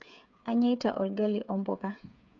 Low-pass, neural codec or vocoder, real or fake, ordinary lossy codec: 7.2 kHz; codec, 16 kHz, 8 kbps, FreqCodec, smaller model; fake; none